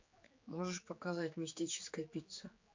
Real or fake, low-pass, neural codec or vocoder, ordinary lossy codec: fake; 7.2 kHz; codec, 16 kHz, 4 kbps, X-Codec, HuBERT features, trained on general audio; MP3, 48 kbps